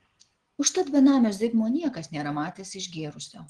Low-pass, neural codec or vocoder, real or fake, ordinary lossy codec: 9.9 kHz; none; real; Opus, 16 kbps